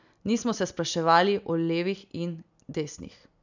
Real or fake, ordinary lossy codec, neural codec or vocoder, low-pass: real; none; none; 7.2 kHz